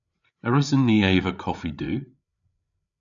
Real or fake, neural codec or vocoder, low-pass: fake; codec, 16 kHz, 8 kbps, FreqCodec, larger model; 7.2 kHz